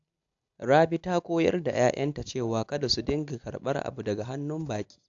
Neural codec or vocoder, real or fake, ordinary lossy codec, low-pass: none; real; AAC, 64 kbps; 7.2 kHz